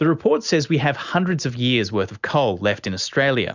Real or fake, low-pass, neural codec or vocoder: real; 7.2 kHz; none